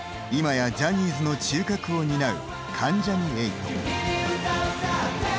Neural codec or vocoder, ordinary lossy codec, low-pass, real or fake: none; none; none; real